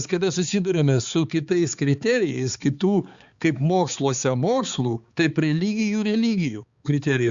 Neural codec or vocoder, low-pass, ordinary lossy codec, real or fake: codec, 16 kHz, 4 kbps, X-Codec, HuBERT features, trained on balanced general audio; 7.2 kHz; Opus, 64 kbps; fake